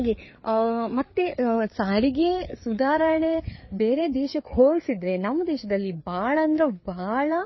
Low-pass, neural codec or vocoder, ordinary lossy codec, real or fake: 7.2 kHz; codec, 16 kHz, 4 kbps, FreqCodec, larger model; MP3, 24 kbps; fake